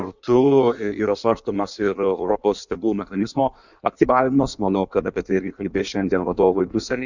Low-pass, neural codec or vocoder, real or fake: 7.2 kHz; codec, 16 kHz in and 24 kHz out, 1.1 kbps, FireRedTTS-2 codec; fake